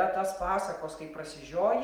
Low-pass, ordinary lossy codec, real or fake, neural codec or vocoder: 19.8 kHz; Opus, 32 kbps; real; none